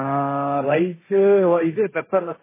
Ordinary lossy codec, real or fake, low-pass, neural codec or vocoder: MP3, 16 kbps; fake; 3.6 kHz; codec, 16 kHz, 1.1 kbps, Voila-Tokenizer